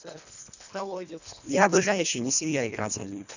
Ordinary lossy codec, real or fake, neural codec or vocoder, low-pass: none; fake; codec, 24 kHz, 1.5 kbps, HILCodec; 7.2 kHz